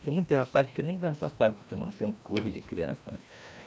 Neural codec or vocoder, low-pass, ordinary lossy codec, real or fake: codec, 16 kHz, 1 kbps, FreqCodec, larger model; none; none; fake